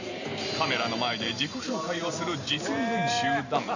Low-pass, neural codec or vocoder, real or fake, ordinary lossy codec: 7.2 kHz; vocoder, 44.1 kHz, 128 mel bands every 512 samples, BigVGAN v2; fake; none